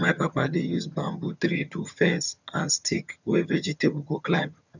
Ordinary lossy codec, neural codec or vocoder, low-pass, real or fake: none; vocoder, 22.05 kHz, 80 mel bands, HiFi-GAN; 7.2 kHz; fake